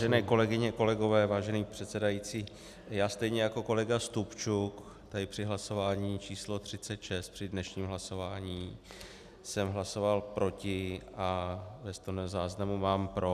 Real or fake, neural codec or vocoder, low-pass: real; none; 14.4 kHz